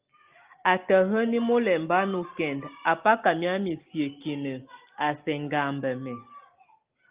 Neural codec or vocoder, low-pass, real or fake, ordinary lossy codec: none; 3.6 kHz; real; Opus, 32 kbps